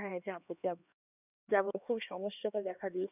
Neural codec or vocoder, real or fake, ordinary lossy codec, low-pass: codec, 16 kHz, 2 kbps, X-Codec, HuBERT features, trained on LibriSpeech; fake; none; 3.6 kHz